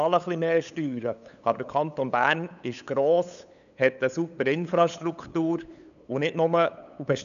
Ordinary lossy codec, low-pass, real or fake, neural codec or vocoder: none; 7.2 kHz; fake; codec, 16 kHz, 8 kbps, FunCodec, trained on LibriTTS, 25 frames a second